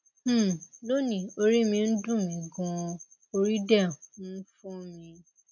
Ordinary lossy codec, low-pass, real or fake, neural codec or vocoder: none; 7.2 kHz; real; none